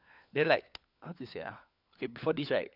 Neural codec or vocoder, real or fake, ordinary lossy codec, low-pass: codec, 16 kHz, 4 kbps, FunCodec, trained on LibriTTS, 50 frames a second; fake; none; 5.4 kHz